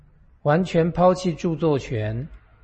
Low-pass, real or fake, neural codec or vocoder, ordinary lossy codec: 9.9 kHz; real; none; MP3, 32 kbps